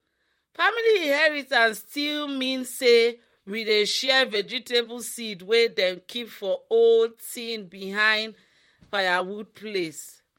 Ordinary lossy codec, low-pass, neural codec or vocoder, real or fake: MP3, 64 kbps; 19.8 kHz; vocoder, 44.1 kHz, 128 mel bands, Pupu-Vocoder; fake